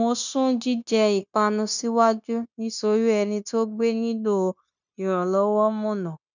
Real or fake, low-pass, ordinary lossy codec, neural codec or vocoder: fake; 7.2 kHz; none; codec, 16 kHz, 0.9 kbps, LongCat-Audio-Codec